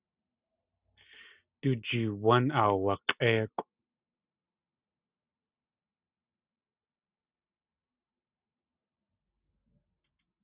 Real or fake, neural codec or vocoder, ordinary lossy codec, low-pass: real; none; Opus, 64 kbps; 3.6 kHz